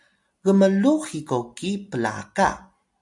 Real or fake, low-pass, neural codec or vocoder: real; 10.8 kHz; none